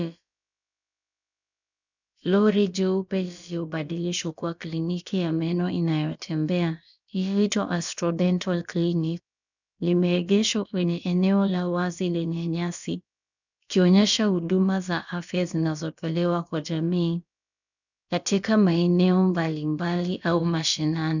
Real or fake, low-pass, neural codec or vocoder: fake; 7.2 kHz; codec, 16 kHz, about 1 kbps, DyCAST, with the encoder's durations